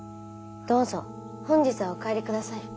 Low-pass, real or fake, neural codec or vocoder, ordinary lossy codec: none; real; none; none